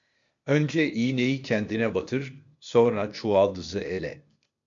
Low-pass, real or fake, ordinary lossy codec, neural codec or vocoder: 7.2 kHz; fake; MP3, 64 kbps; codec, 16 kHz, 0.8 kbps, ZipCodec